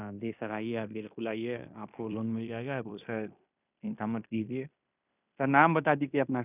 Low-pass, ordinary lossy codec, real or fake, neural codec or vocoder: 3.6 kHz; none; fake; codec, 16 kHz in and 24 kHz out, 0.9 kbps, LongCat-Audio-Codec, fine tuned four codebook decoder